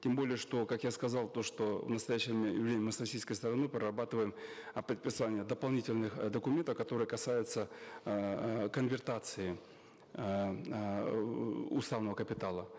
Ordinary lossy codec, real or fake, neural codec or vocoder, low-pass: none; real; none; none